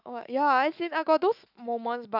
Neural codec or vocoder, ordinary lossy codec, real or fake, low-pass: autoencoder, 48 kHz, 128 numbers a frame, DAC-VAE, trained on Japanese speech; none; fake; 5.4 kHz